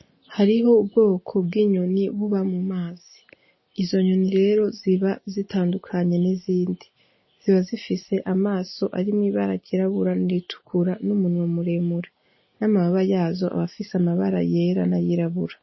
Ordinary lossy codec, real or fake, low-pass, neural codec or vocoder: MP3, 24 kbps; real; 7.2 kHz; none